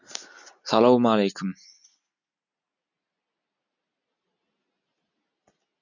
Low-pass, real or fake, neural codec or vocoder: 7.2 kHz; real; none